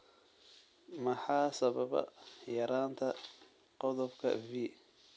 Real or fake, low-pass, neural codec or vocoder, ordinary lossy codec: real; none; none; none